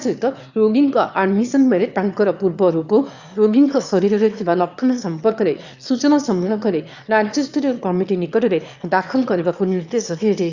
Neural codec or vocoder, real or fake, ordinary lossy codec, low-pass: autoencoder, 22.05 kHz, a latent of 192 numbers a frame, VITS, trained on one speaker; fake; Opus, 64 kbps; 7.2 kHz